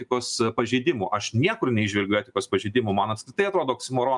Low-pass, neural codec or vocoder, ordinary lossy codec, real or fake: 10.8 kHz; none; MP3, 96 kbps; real